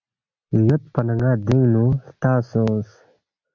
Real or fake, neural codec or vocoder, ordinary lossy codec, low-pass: real; none; MP3, 64 kbps; 7.2 kHz